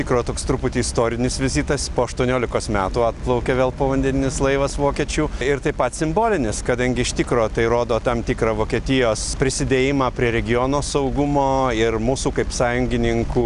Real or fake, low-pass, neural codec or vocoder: real; 10.8 kHz; none